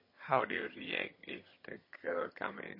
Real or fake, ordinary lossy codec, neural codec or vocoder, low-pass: fake; MP3, 24 kbps; vocoder, 22.05 kHz, 80 mel bands, HiFi-GAN; 5.4 kHz